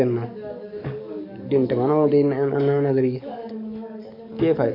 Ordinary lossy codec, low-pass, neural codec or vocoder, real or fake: none; 5.4 kHz; codec, 44.1 kHz, 7.8 kbps, DAC; fake